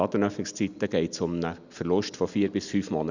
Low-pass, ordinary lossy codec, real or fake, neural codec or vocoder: 7.2 kHz; none; real; none